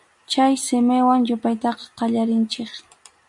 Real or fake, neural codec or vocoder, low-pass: real; none; 10.8 kHz